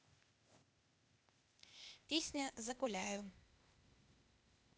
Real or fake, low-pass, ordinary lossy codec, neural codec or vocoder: fake; none; none; codec, 16 kHz, 0.8 kbps, ZipCodec